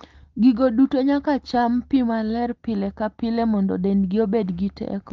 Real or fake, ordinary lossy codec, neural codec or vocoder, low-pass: real; Opus, 32 kbps; none; 7.2 kHz